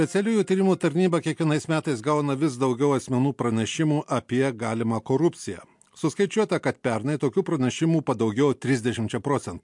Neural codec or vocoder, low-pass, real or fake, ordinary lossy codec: none; 10.8 kHz; real; MP3, 64 kbps